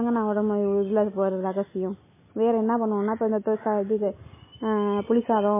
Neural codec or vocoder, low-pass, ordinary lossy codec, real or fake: none; 3.6 kHz; MP3, 16 kbps; real